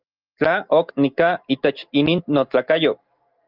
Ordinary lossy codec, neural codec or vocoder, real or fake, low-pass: Opus, 24 kbps; none; real; 5.4 kHz